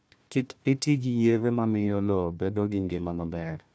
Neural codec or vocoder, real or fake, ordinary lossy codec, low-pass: codec, 16 kHz, 1 kbps, FunCodec, trained on Chinese and English, 50 frames a second; fake; none; none